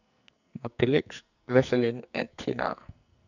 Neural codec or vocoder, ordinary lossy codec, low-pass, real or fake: codec, 44.1 kHz, 2.6 kbps, SNAC; none; 7.2 kHz; fake